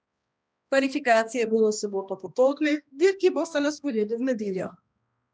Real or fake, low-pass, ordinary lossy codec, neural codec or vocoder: fake; none; none; codec, 16 kHz, 1 kbps, X-Codec, HuBERT features, trained on balanced general audio